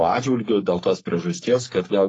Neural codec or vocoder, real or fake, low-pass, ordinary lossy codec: codec, 44.1 kHz, 3.4 kbps, Pupu-Codec; fake; 10.8 kHz; AAC, 32 kbps